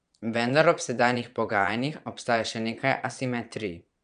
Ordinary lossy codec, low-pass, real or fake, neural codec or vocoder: none; 9.9 kHz; fake; vocoder, 22.05 kHz, 80 mel bands, Vocos